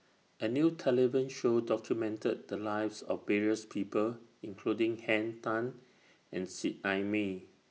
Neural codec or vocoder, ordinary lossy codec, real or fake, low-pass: none; none; real; none